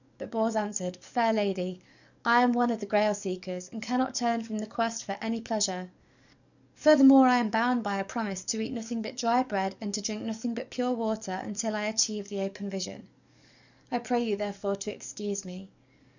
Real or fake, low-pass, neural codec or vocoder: fake; 7.2 kHz; codec, 44.1 kHz, 7.8 kbps, DAC